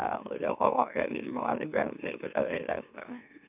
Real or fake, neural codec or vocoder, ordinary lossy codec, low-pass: fake; autoencoder, 44.1 kHz, a latent of 192 numbers a frame, MeloTTS; none; 3.6 kHz